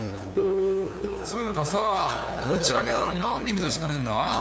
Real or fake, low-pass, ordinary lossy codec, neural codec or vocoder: fake; none; none; codec, 16 kHz, 2 kbps, FunCodec, trained on LibriTTS, 25 frames a second